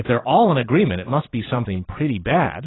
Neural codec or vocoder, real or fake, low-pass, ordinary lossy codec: vocoder, 22.05 kHz, 80 mel bands, Vocos; fake; 7.2 kHz; AAC, 16 kbps